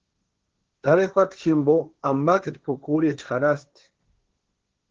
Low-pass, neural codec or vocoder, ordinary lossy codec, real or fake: 7.2 kHz; codec, 16 kHz, 1.1 kbps, Voila-Tokenizer; Opus, 16 kbps; fake